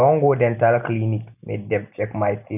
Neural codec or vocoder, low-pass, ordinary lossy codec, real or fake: none; 3.6 kHz; none; real